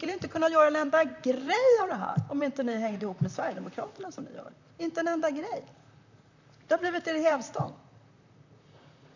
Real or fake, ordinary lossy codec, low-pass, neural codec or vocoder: fake; none; 7.2 kHz; vocoder, 44.1 kHz, 128 mel bands, Pupu-Vocoder